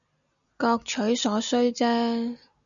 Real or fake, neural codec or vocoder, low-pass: real; none; 7.2 kHz